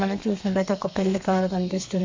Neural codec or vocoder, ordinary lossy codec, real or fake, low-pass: codec, 32 kHz, 1.9 kbps, SNAC; MP3, 48 kbps; fake; 7.2 kHz